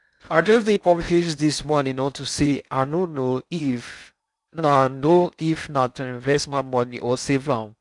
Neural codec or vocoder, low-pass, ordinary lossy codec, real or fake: codec, 16 kHz in and 24 kHz out, 0.6 kbps, FocalCodec, streaming, 4096 codes; 10.8 kHz; none; fake